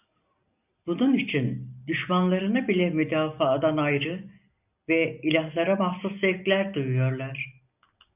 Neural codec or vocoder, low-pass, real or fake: none; 3.6 kHz; real